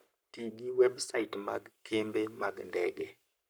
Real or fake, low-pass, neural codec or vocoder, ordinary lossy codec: fake; none; codec, 44.1 kHz, 7.8 kbps, Pupu-Codec; none